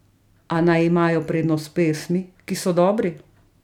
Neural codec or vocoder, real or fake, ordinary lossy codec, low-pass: none; real; none; 19.8 kHz